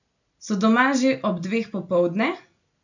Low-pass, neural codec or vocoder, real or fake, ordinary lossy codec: 7.2 kHz; none; real; none